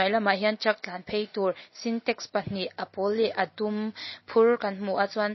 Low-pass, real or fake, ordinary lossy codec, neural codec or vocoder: 7.2 kHz; real; MP3, 24 kbps; none